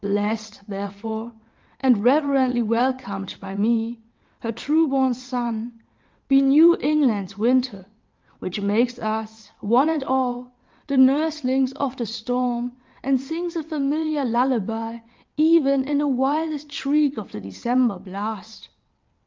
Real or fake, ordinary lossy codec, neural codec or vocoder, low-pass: fake; Opus, 24 kbps; vocoder, 22.05 kHz, 80 mel bands, WaveNeXt; 7.2 kHz